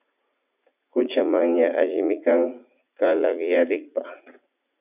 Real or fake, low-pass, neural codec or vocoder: fake; 3.6 kHz; vocoder, 44.1 kHz, 80 mel bands, Vocos